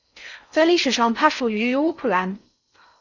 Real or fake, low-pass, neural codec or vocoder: fake; 7.2 kHz; codec, 16 kHz in and 24 kHz out, 0.6 kbps, FocalCodec, streaming, 4096 codes